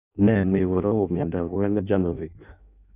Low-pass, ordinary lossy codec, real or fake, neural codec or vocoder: 3.6 kHz; none; fake; codec, 16 kHz in and 24 kHz out, 0.6 kbps, FireRedTTS-2 codec